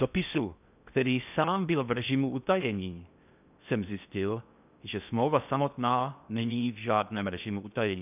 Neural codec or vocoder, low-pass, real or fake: codec, 16 kHz in and 24 kHz out, 0.6 kbps, FocalCodec, streaming, 4096 codes; 3.6 kHz; fake